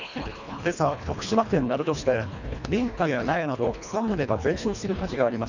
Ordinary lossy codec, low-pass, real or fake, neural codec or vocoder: none; 7.2 kHz; fake; codec, 24 kHz, 1.5 kbps, HILCodec